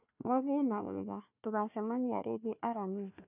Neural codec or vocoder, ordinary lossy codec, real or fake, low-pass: codec, 16 kHz, 1 kbps, FunCodec, trained on Chinese and English, 50 frames a second; none; fake; 3.6 kHz